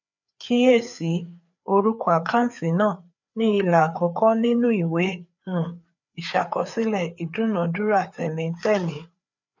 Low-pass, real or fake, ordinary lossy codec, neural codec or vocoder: 7.2 kHz; fake; none; codec, 16 kHz, 4 kbps, FreqCodec, larger model